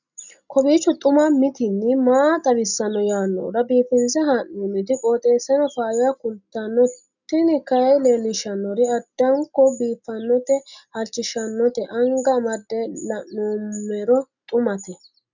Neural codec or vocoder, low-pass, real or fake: none; 7.2 kHz; real